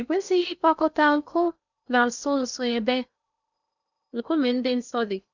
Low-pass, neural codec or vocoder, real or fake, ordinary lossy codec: 7.2 kHz; codec, 16 kHz in and 24 kHz out, 0.6 kbps, FocalCodec, streaming, 2048 codes; fake; none